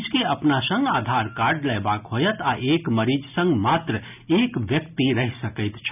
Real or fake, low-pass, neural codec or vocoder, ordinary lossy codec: real; 3.6 kHz; none; none